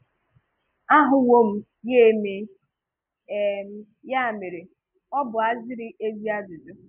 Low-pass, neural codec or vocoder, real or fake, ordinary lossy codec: 3.6 kHz; none; real; none